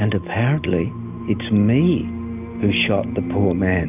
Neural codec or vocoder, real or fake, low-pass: none; real; 3.6 kHz